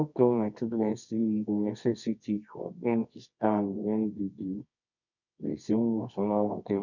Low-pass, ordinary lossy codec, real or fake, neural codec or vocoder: 7.2 kHz; none; fake; codec, 24 kHz, 0.9 kbps, WavTokenizer, medium music audio release